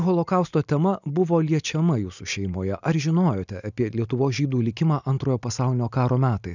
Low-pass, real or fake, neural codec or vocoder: 7.2 kHz; real; none